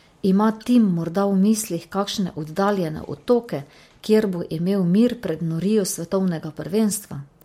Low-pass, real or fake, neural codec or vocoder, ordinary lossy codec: 19.8 kHz; real; none; MP3, 64 kbps